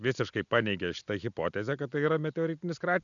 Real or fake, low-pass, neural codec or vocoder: real; 7.2 kHz; none